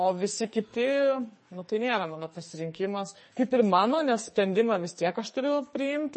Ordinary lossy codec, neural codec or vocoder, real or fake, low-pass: MP3, 32 kbps; codec, 44.1 kHz, 2.6 kbps, SNAC; fake; 10.8 kHz